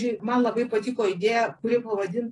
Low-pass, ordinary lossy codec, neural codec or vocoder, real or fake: 10.8 kHz; AAC, 32 kbps; none; real